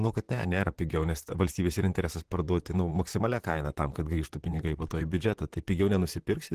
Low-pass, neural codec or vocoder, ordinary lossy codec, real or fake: 14.4 kHz; vocoder, 44.1 kHz, 128 mel bands, Pupu-Vocoder; Opus, 16 kbps; fake